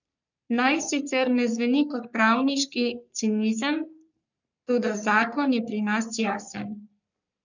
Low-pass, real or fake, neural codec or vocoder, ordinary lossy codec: 7.2 kHz; fake; codec, 44.1 kHz, 3.4 kbps, Pupu-Codec; none